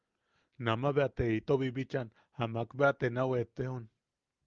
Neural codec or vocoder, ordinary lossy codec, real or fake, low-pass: none; Opus, 32 kbps; real; 7.2 kHz